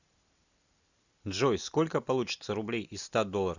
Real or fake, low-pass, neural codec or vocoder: real; 7.2 kHz; none